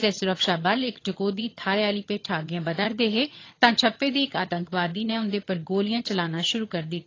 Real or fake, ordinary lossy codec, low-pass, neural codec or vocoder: fake; AAC, 32 kbps; 7.2 kHz; vocoder, 22.05 kHz, 80 mel bands, HiFi-GAN